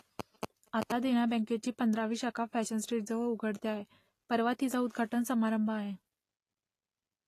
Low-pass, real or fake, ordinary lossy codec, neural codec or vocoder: 14.4 kHz; real; AAC, 48 kbps; none